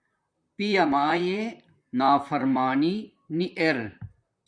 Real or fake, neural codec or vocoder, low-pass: fake; vocoder, 44.1 kHz, 128 mel bands, Pupu-Vocoder; 9.9 kHz